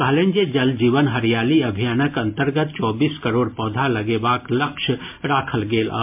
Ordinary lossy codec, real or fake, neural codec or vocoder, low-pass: MP3, 32 kbps; real; none; 3.6 kHz